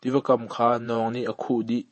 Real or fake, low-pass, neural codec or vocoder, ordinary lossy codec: fake; 10.8 kHz; vocoder, 48 kHz, 128 mel bands, Vocos; MP3, 32 kbps